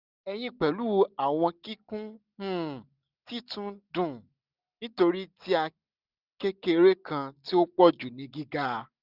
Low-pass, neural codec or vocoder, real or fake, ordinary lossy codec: 5.4 kHz; none; real; none